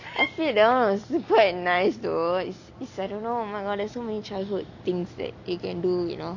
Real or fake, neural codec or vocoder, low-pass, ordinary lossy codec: real; none; 7.2 kHz; none